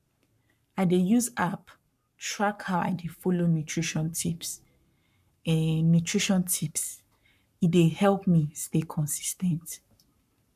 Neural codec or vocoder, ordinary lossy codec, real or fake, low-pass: codec, 44.1 kHz, 7.8 kbps, Pupu-Codec; none; fake; 14.4 kHz